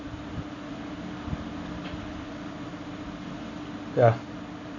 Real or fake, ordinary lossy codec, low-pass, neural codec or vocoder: real; none; 7.2 kHz; none